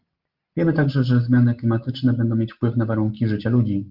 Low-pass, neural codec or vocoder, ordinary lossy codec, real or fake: 5.4 kHz; none; Opus, 24 kbps; real